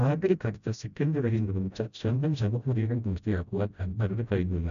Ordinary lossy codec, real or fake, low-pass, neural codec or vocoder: none; fake; 7.2 kHz; codec, 16 kHz, 0.5 kbps, FreqCodec, smaller model